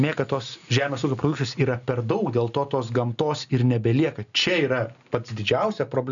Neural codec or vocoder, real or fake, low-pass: none; real; 7.2 kHz